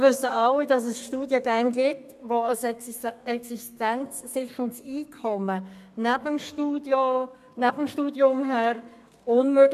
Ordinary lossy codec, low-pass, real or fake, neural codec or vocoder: MP3, 96 kbps; 14.4 kHz; fake; codec, 32 kHz, 1.9 kbps, SNAC